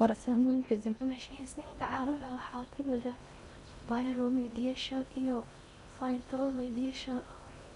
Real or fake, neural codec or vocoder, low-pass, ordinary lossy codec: fake; codec, 16 kHz in and 24 kHz out, 0.6 kbps, FocalCodec, streaming, 2048 codes; 10.8 kHz; none